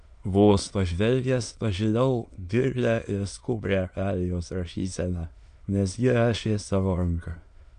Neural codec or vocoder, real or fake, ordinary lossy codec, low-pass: autoencoder, 22.05 kHz, a latent of 192 numbers a frame, VITS, trained on many speakers; fake; MP3, 64 kbps; 9.9 kHz